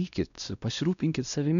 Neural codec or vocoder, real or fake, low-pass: codec, 16 kHz, 1 kbps, X-Codec, WavLM features, trained on Multilingual LibriSpeech; fake; 7.2 kHz